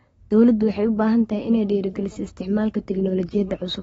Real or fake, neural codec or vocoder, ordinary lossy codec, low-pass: fake; codec, 44.1 kHz, 7.8 kbps, Pupu-Codec; AAC, 24 kbps; 19.8 kHz